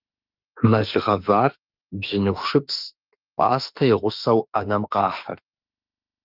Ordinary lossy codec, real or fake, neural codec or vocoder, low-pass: Opus, 24 kbps; fake; autoencoder, 48 kHz, 32 numbers a frame, DAC-VAE, trained on Japanese speech; 5.4 kHz